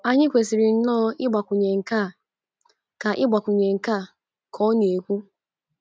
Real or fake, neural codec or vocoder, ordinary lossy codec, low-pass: real; none; none; none